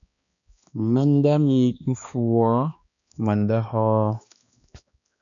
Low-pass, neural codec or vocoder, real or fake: 7.2 kHz; codec, 16 kHz, 2 kbps, X-Codec, HuBERT features, trained on balanced general audio; fake